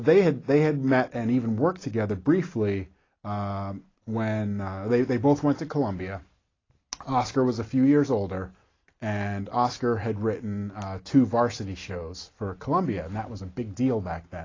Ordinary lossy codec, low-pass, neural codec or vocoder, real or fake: AAC, 32 kbps; 7.2 kHz; none; real